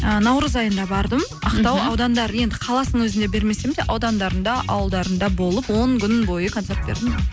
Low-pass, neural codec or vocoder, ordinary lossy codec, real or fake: none; none; none; real